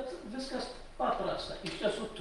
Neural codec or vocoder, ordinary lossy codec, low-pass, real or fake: none; Opus, 24 kbps; 10.8 kHz; real